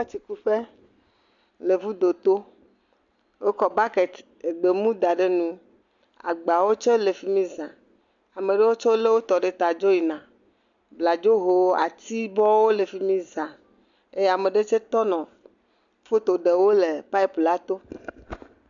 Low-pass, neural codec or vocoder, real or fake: 7.2 kHz; none; real